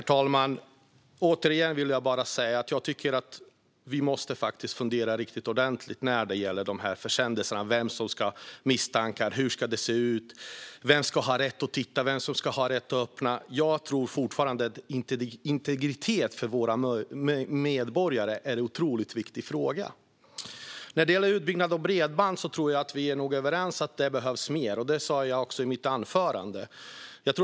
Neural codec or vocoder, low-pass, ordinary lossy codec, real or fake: none; none; none; real